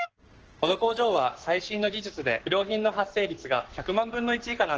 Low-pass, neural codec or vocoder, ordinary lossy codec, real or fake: 7.2 kHz; codec, 44.1 kHz, 7.8 kbps, Pupu-Codec; Opus, 16 kbps; fake